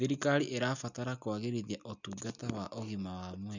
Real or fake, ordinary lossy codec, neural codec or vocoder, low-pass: real; none; none; 7.2 kHz